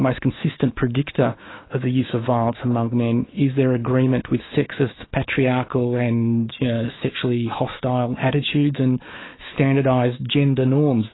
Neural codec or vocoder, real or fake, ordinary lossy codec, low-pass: autoencoder, 48 kHz, 128 numbers a frame, DAC-VAE, trained on Japanese speech; fake; AAC, 16 kbps; 7.2 kHz